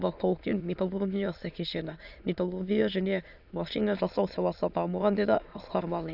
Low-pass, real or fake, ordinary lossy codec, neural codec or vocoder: 5.4 kHz; fake; none; autoencoder, 22.05 kHz, a latent of 192 numbers a frame, VITS, trained on many speakers